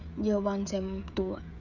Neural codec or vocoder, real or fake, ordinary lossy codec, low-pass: codec, 16 kHz, 16 kbps, FreqCodec, smaller model; fake; none; 7.2 kHz